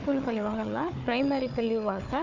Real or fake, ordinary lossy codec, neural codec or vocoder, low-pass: fake; none; codec, 16 kHz, 4 kbps, FunCodec, trained on Chinese and English, 50 frames a second; 7.2 kHz